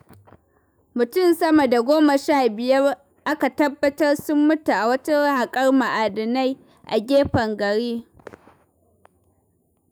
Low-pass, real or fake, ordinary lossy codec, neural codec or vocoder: none; fake; none; autoencoder, 48 kHz, 128 numbers a frame, DAC-VAE, trained on Japanese speech